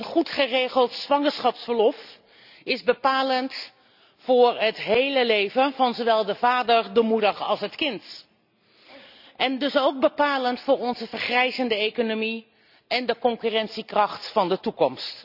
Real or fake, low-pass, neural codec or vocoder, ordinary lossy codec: real; 5.4 kHz; none; none